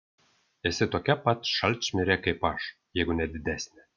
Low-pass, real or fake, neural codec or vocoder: 7.2 kHz; real; none